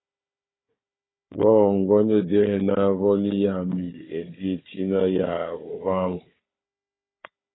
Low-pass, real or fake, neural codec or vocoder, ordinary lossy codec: 7.2 kHz; fake; codec, 16 kHz, 4 kbps, FunCodec, trained on Chinese and English, 50 frames a second; AAC, 16 kbps